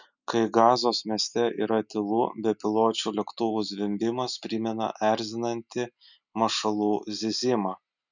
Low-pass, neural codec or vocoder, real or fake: 7.2 kHz; none; real